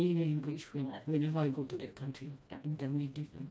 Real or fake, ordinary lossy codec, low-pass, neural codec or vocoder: fake; none; none; codec, 16 kHz, 1 kbps, FreqCodec, smaller model